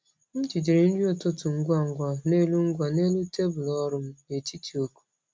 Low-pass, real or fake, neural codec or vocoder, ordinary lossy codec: none; real; none; none